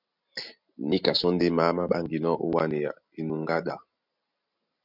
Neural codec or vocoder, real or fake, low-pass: none; real; 5.4 kHz